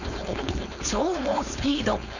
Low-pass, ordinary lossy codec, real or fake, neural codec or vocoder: 7.2 kHz; none; fake; codec, 16 kHz, 4.8 kbps, FACodec